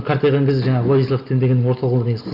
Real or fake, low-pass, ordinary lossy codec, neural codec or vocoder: real; 5.4 kHz; none; none